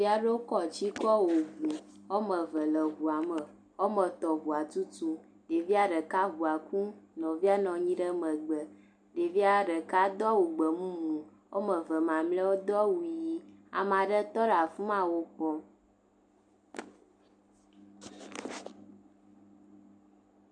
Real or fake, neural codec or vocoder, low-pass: real; none; 9.9 kHz